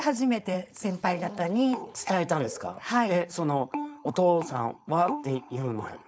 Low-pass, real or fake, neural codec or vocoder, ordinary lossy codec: none; fake; codec, 16 kHz, 4.8 kbps, FACodec; none